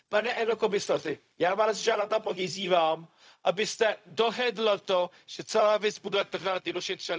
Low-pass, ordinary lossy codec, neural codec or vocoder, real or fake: none; none; codec, 16 kHz, 0.4 kbps, LongCat-Audio-Codec; fake